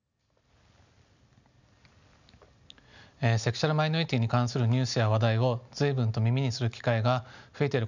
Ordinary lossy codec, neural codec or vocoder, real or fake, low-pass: none; none; real; 7.2 kHz